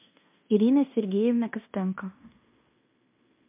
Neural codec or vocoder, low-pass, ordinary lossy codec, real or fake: codec, 16 kHz in and 24 kHz out, 0.9 kbps, LongCat-Audio-Codec, fine tuned four codebook decoder; 3.6 kHz; MP3, 32 kbps; fake